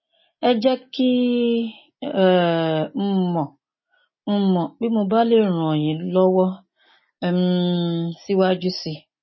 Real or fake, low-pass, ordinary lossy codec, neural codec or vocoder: real; 7.2 kHz; MP3, 24 kbps; none